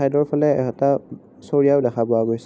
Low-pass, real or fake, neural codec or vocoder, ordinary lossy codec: none; real; none; none